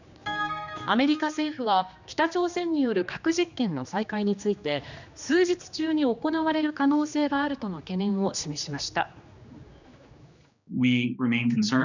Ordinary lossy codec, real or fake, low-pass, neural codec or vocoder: none; fake; 7.2 kHz; codec, 16 kHz, 2 kbps, X-Codec, HuBERT features, trained on general audio